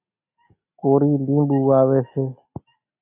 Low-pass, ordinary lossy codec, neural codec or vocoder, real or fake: 3.6 kHz; AAC, 32 kbps; none; real